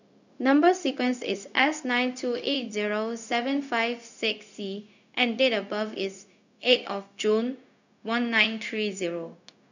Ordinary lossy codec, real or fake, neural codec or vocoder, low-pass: none; fake; codec, 16 kHz, 0.4 kbps, LongCat-Audio-Codec; 7.2 kHz